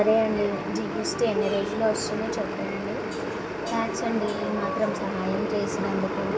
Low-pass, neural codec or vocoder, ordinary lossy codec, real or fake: none; none; none; real